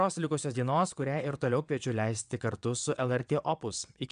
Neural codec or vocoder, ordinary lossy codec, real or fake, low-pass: vocoder, 22.05 kHz, 80 mel bands, WaveNeXt; AAC, 96 kbps; fake; 9.9 kHz